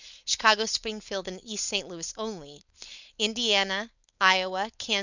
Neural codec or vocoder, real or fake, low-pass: codec, 16 kHz, 4.8 kbps, FACodec; fake; 7.2 kHz